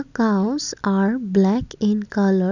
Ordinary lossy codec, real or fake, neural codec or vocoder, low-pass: none; real; none; 7.2 kHz